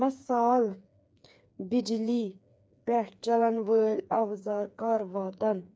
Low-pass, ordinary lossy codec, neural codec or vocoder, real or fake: none; none; codec, 16 kHz, 4 kbps, FreqCodec, smaller model; fake